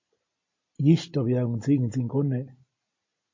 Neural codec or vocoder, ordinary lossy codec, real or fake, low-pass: none; MP3, 32 kbps; real; 7.2 kHz